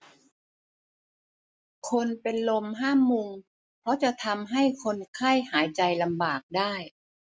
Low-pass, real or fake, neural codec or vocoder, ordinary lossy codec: none; real; none; none